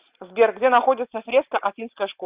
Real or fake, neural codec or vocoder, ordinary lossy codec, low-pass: real; none; Opus, 24 kbps; 3.6 kHz